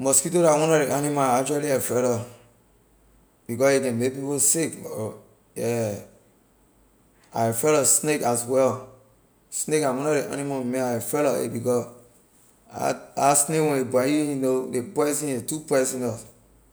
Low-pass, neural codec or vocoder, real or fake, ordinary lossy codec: none; none; real; none